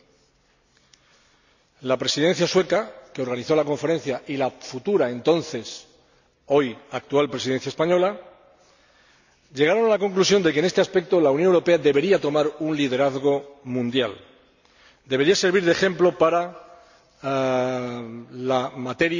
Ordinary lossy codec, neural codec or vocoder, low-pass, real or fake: none; none; 7.2 kHz; real